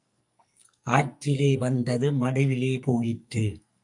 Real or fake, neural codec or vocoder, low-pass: fake; codec, 44.1 kHz, 2.6 kbps, SNAC; 10.8 kHz